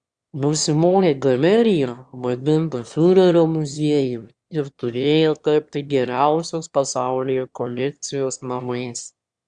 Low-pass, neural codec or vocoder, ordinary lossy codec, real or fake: 9.9 kHz; autoencoder, 22.05 kHz, a latent of 192 numbers a frame, VITS, trained on one speaker; Opus, 64 kbps; fake